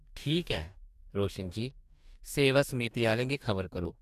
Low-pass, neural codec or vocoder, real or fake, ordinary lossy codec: 14.4 kHz; codec, 44.1 kHz, 2.6 kbps, DAC; fake; none